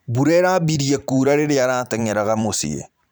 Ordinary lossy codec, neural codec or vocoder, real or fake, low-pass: none; vocoder, 44.1 kHz, 128 mel bands every 512 samples, BigVGAN v2; fake; none